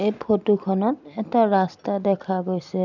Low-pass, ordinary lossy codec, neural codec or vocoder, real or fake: 7.2 kHz; none; none; real